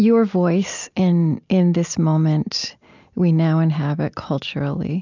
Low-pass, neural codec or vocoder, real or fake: 7.2 kHz; none; real